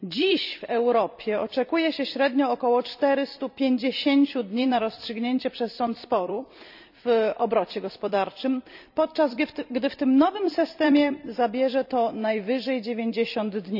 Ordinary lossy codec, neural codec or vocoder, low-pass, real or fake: none; vocoder, 44.1 kHz, 128 mel bands every 256 samples, BigVGAN v2; 5.4 kHz; fake